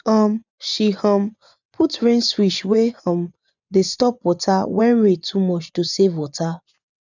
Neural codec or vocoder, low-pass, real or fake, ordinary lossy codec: vocoder, 24 kHz, 100 mel bands, Vocos; 7.2 kHz; fake; none